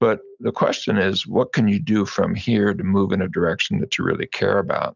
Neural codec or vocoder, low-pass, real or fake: none; 7.2 kHz; real